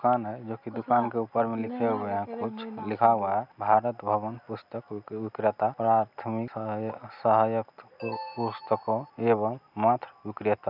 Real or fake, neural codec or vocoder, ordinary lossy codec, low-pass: real; none; none; 5.4 kHz